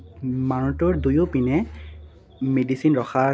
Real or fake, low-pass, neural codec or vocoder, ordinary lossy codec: real; none; none; none